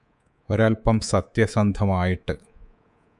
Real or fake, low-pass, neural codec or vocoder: fake; 10.8 kHz; codec, 24 kHz, 3.1 kbps, DualCodec